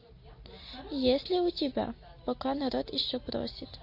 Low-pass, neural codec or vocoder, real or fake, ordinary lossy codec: 5.4 kHz; none; real; MP3, 32 kbps